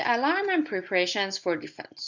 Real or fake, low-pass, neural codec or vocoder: real; 7.2 kHz; none